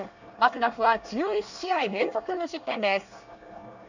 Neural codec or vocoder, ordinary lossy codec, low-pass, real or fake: codec, 24 kHz, 1 kbps, SNAC; none; 7.2 kHz; fake